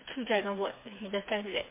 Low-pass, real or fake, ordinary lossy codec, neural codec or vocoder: 3.6 kHz; fake; MP3, 24 kbps; codec, 16 kHz, 4 kbps, FreqCodec, smaller model